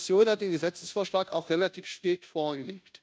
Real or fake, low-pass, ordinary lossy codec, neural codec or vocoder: fake; none; none; codec, 16 kHz, 0.5 kbps, FunCodec, trained on Chinese and English, 25 frames a second